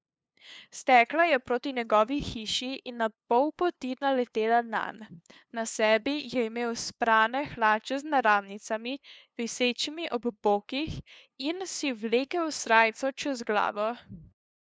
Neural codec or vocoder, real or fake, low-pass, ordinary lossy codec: codec, 16 kHz, 2 kbps, FunCodec, trained on LibriTTS, 25 frames a second; fake; none; none